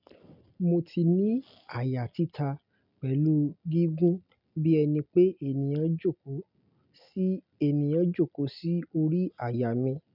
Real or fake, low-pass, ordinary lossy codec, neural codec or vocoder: real; 5.4 kHz; none; none